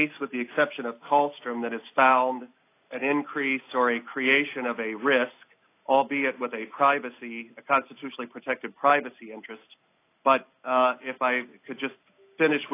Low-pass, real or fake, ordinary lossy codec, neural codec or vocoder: 3.6 kHz; real; AAC, 24 kbps; none